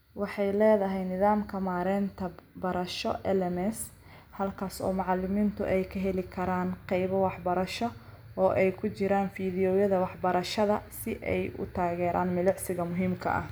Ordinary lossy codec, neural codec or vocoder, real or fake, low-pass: none; none; real; none